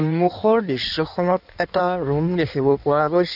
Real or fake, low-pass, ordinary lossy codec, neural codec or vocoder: fake; 5.4 kHz; none; codec, 16 kHz in and 24 kHz out, 1.1 kbps, FireRedTTS-2 codec